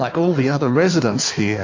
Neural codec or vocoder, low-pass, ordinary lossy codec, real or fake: codec, 16 kHz in and 24 kHz out, 1.1 kbps, FireRedTTS-2 codec; 7.2 kHz; AAC, 48 kbps; fake